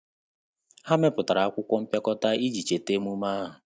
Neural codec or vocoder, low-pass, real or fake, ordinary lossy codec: none; none; real; none